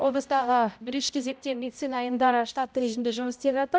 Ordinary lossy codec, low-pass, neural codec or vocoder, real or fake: none; none; codec, 16 kHz, 0.5 kbps, X-Codec, HuBERT features, trained on balanced general audio; fake